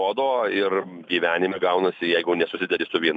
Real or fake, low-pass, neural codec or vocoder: real; 10.8 kHz; none